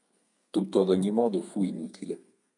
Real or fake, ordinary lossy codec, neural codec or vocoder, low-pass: fake; MP3, 96 kbps; codec, 32 kHz, 1.9 kbps, SNAC; 10.8 kHz